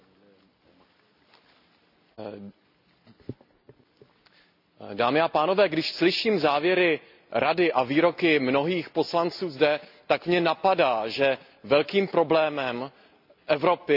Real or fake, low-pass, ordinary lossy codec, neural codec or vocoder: real; 5.4 kHz; AAC, 48 kbps; none